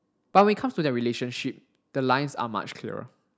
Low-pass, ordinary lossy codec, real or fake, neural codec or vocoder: none; none; real; none